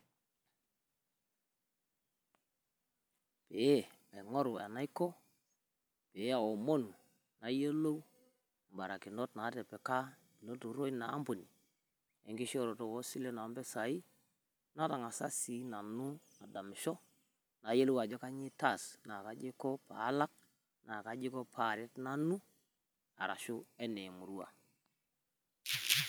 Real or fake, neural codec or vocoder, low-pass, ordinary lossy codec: real; none; none; none